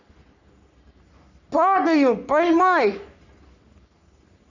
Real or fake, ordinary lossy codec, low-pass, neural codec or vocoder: fake; none; 7.2 kHz; codec, 44.1 kHz, 3.4 kbps, Pupu-Codec